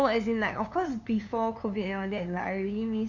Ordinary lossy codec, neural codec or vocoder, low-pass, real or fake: none; codec, 16 kHz, 2 kbps, FunCodec, trained on LibriTTS, 25 frames a second; 7.2 kHz; fake